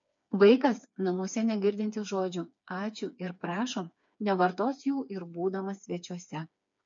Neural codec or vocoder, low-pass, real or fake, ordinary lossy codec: codec, 16 kHz, 4 kbps, FreqCodec, smaller model; 7.2 kHz; fake; MP3, 48 kbps